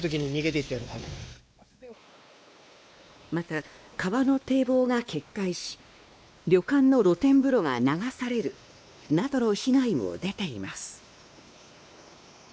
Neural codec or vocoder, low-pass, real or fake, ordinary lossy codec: codec, 16 kHz, 2 kbps, X-Codec, WavLM features, trained on Multilingual LibriSpeech; none; fake; none